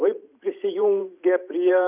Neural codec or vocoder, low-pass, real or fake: vocoder, 44.1 kHz, 128 mel bands every 512 samples, BigVGAN v2; 3.6 kHz; fake